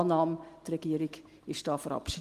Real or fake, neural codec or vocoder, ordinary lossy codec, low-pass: real; none; Opus, 24 kbps; 14.4 kHz